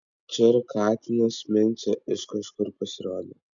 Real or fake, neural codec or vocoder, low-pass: real; none; 7.2 kHz